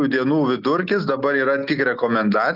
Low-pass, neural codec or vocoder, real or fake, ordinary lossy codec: 5.4 kHz; none; real; Opus, 24 kbps